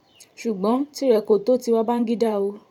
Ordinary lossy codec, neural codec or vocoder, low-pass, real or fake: MP3, 96 kbps; vocoder, 44.1 kHz, 128 mel bands every 512 samples, BigVGAN v2; 19.8 kHz; fake